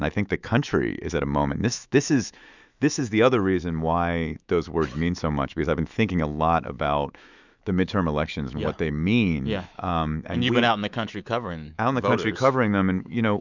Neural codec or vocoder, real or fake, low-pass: autoencoder, 48 kHz, 128 numbers a frame, DAC-VAE, trained on Japanese speech; fake; 7.2 kHz